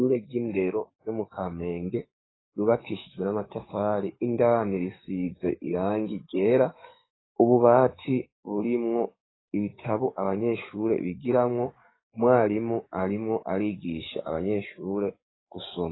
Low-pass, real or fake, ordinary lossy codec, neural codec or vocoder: 7.2 kHz; fake; AAC, 16 kbps; codec, 44.1 kHz, 7.8 kbps, DAC